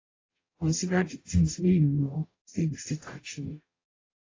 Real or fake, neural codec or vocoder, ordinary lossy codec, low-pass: fake; codec, 44.1 kHz, 0.9 kbps, DAC; AAC, 32 kbps; 7.2 kHz